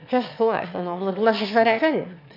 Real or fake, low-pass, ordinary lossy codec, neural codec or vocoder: fake; 5.4 kHz; none; autoencoder, 22.05 kHz, a latent of 192 numbers a frame, VITS, trained on one speaker